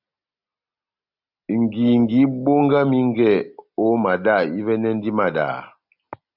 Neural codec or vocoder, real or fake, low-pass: none; real; 5.4 kHz